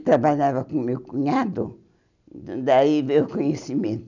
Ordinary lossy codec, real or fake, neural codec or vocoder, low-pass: none; real; none; 7.2 kHz